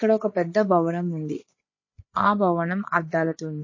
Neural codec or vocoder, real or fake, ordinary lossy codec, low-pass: codec, 16 kHz, 8 kbps, FreqCodec, larger model; fake; MP3, 32 kbps; 7.2 kHz